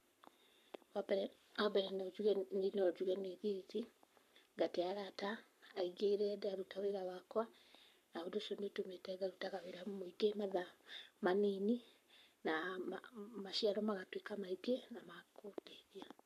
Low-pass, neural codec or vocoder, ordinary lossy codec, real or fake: 14.4 kHz; codec, 44.1 kHz, 7.8 kbps, Pupu-Codec; none; fake